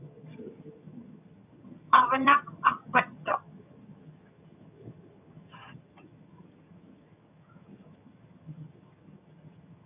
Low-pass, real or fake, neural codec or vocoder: 3.6 kHz; fake; vocoder, 22.05 kHz, 80 mel bands, HiFi-GAN